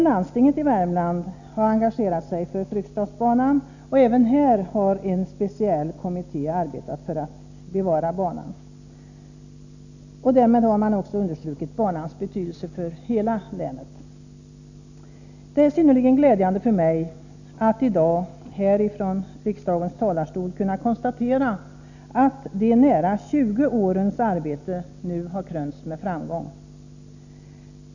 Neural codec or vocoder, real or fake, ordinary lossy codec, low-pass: none; real; none; 7.2 kHz